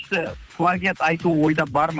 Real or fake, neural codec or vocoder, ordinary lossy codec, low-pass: fake; codec, 16 kHz, 8 kbps, FunCodec, trained on Chinese and English, 25 frames a second; none; none